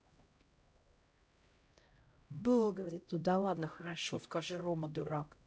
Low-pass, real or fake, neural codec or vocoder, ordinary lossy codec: none; fake; codec, 16 kHz, 0.5 kbps, X-Codec, HuBERT features, trained on LibriSpeech; none